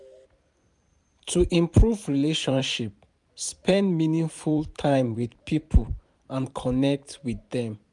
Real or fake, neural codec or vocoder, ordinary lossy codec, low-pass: fake; vocoder, 44.1 kHz, 128 mel bands every 512 samples, BigVGAN v2; none; 10.8 kHz